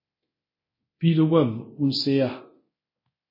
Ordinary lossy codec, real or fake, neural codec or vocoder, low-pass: MP3, 24 kbps; fake; codec, 24 kHz, 0.9 kbps, DualCodec; 5.4 kHz